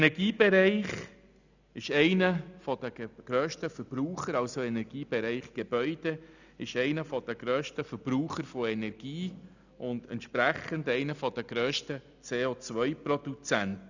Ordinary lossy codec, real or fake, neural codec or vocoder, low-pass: none; real; none; 7.2 kHz